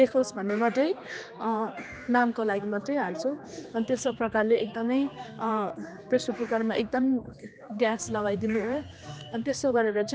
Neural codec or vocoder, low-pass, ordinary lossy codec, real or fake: codec, 16 kHz, 2 kbps, X-Codec, HuBERT features, trained on general audio; none; none; fake